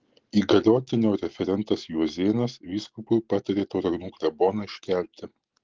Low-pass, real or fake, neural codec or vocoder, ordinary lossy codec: 7.2 kHz; real; none; Opus, 16 kbps